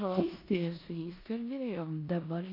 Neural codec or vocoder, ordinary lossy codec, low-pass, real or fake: codec, 16 kHz in and 24 kHz out, 0.9 kbps, LongCat-Audio-Codec, four codebook decoder; MP3, 32 kbps; 5.4 kHz; fake